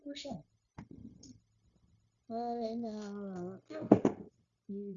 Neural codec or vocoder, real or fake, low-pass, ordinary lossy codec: codec, 16 kHz, 0.9 kbps, LongCat-Audio-Codec; fake; 7.2 kHz; Opus, 64 kbps